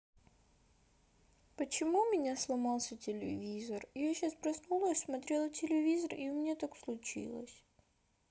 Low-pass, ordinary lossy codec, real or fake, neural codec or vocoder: none; none; real; none